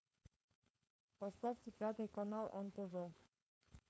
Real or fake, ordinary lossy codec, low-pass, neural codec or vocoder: fake; none; none; codec, 16 kHz, 4.8 kbps, FACodec